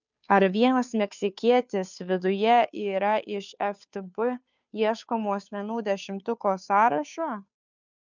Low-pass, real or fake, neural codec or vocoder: 7.2 kHz; fake; codec, 16 kHz, 2 kbps, FunCodec, trained on Chinese and English, 25 frames a second